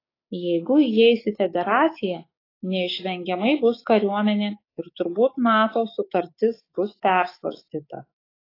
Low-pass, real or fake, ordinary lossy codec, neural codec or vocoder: 5.4 kHz; fake; AAC, 24 kbps; codec, 16 kHz, 6 kbps, DAC